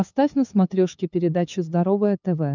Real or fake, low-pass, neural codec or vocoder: fake; 7.2 kHz; codec, 16 kHz, 8 kbps, FunCodec, trained on LibriTTS, 25 frames a second